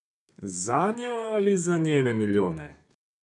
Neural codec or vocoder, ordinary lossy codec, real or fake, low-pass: codec, 32 kHz, 1.9 kbps, SNAC; none; fake; 10.8 kHz